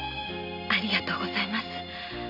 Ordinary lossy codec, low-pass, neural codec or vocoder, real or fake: none; 5.4 kHz; none; real